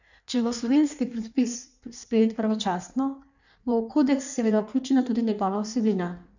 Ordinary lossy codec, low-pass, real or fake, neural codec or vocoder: none; 7.2 kHz; fake; codec, 16 kHz in and 24 kHz out, 1.1 kbps, FireRedTTS-2 codec